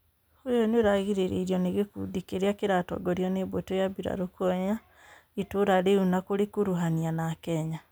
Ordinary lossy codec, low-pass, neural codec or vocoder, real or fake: none; none; none; real